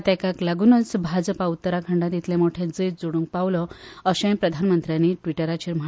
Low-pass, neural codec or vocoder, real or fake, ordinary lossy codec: none; none; real; none